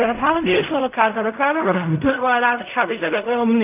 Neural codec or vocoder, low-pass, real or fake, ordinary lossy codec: codec, 16 kHz in and 24 kHz out, 0.4 kbps, LongCat-Audio-Codec, fine tuned four codebook decoder; 3.6 kHz; fake; none